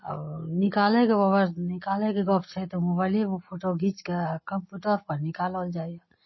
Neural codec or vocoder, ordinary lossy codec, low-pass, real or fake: none; MP3, 24 kbps; 7.2 kHz; real